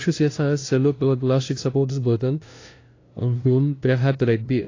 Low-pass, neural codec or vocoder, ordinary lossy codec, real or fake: 7.2 kHz; codec, 16 kHz, 0.5 kbps, FunCodec, trained on LibriTTS, 25 frames a second; AAC, 32 kbps; fake